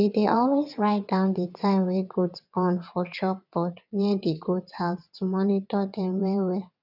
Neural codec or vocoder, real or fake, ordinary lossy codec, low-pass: vocoder, 22.05 kHz, 80 mel bands, WaveNeXt; fake; none; 5.4 kHz